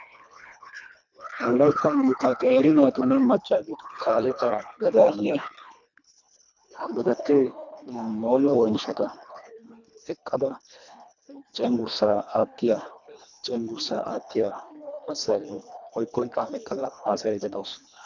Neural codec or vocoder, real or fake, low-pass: codec, 24 kHz, 1.5 kbps, HILCodec; fake; 7.2 kHz